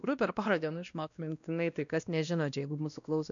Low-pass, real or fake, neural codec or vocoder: 7.2 kHz; fake; codec, 16 kHz, 1 kbps, X-Codec, WavLM features, trained on Multilingual LibriSpeech